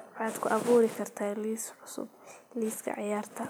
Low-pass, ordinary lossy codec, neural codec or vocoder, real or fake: none; none; none; real